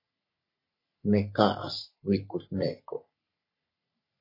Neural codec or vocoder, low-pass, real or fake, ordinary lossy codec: codec, 44.1 kHz, 3.4 kbps, Pupu-Codec; 5.4 kHz; fake; MP3, 32 kbps